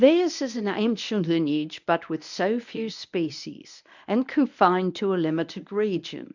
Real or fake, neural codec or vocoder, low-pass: fake; codec, 24 kHz, 0.9 kbps, WavTokenizer, medium speech release version 1; 7.2 kHz